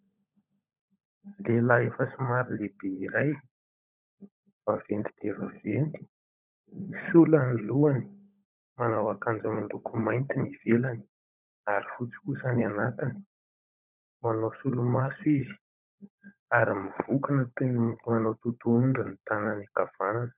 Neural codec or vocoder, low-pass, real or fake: codec, 16 kHz, 16 kbps, FunCodec, trained on LibriTTS, 50 frames a second; 3.6 kHz; fake